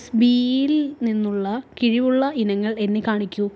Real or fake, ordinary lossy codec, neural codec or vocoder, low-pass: real; none; none; none